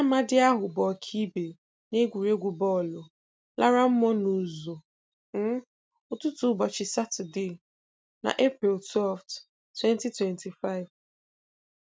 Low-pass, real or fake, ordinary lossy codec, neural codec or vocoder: none; real; none; none